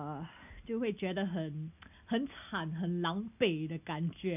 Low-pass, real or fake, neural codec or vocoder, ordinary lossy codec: 3.6 kHz; real; none; none